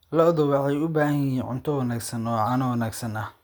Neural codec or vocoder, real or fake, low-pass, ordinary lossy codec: none; real; none; none